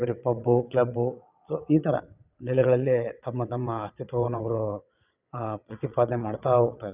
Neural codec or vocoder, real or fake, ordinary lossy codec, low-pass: vocoder, 22.05 kHz, 80 mel bands, WaveNeXt; fake; none; 3.6 kHz